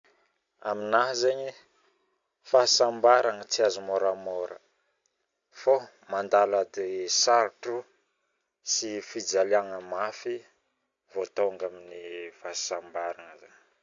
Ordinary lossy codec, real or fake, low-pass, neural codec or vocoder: AAC, 64 kbps; real; 7.2 kHz; none